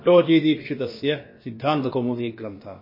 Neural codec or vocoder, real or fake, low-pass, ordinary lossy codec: codec, 16 kHz, about 1 kbps, DyCAST, with the encoder's durations; fake; 5.4 kHz; MP3, 24 kbps